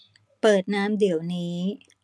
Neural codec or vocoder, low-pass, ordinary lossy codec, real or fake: none; none; none; real